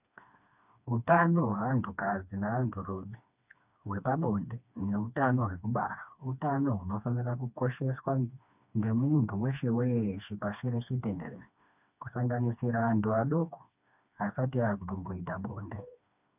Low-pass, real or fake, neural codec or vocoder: 3.6 kHz; fake; codec, 16 kHz, 2 kbps, FreqCodec, smaller model